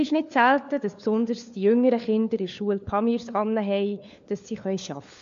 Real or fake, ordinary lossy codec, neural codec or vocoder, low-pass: fake; AAC, 64 kbps; codec, 16 kHz, 4 kbps, FunCodec, trained on LibriTTS, 50 frames a second; 7.2 kHz